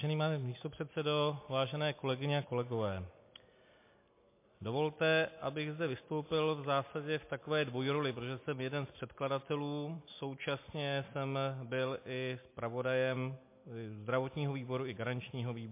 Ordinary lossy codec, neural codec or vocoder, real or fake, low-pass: MP3, 24 kbps; none; real; 3.6 kHz